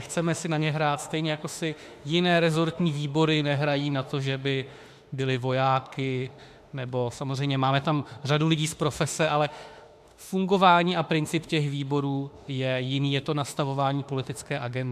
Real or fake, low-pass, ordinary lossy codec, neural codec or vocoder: fake; 14.4 kHz; AAC, 96 kbps; autoencoder, 48 kHz, 32 numbers a frame, DAC-VAE, trained on Japanese speech